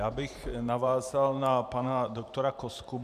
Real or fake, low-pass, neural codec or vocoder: fake; 14.4 kHz; vocoder, 44.1 kHz, 128 mel bands every 512 samples, BigVGAN v2